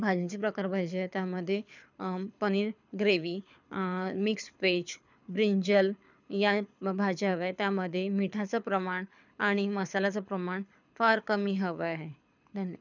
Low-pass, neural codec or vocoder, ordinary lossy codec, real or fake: 7.2 kHz; codec, 24 kHz, 6 kbps, HILCodec; none; fake